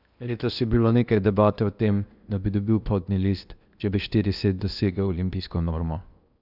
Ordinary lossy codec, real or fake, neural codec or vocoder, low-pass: none; fake; codec, 16 kHz in and 24 kHz out, 0.8 kbps, FocalCodec, streaming, 65536 codes; 5.4 kHz